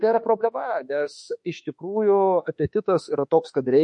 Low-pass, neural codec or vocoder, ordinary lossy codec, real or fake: 10.8 kHz; autoencoder, 48 kHz, 32 numbers a frame, DAC-VAE, trained on Japanese speech; MP3, 48 kbps; fake